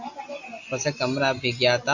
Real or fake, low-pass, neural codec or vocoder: real; 7.2 kHz; none